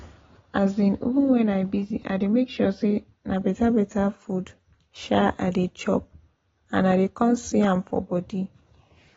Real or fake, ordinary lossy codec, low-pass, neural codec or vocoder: real; AAC, 24 kbps; 7.2 kHz; none